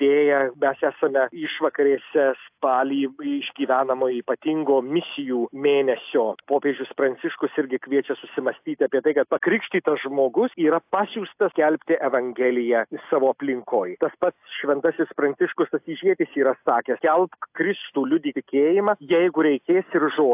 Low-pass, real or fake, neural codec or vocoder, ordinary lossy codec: 3.6 kHz; real; none; AAC, 32 kbps